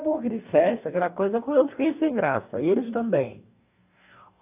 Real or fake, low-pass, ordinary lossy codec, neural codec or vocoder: fake; 3.6 kHz; AAC, 32 kbps; codec, 44.1 kHz, 2.6 kbps, DAC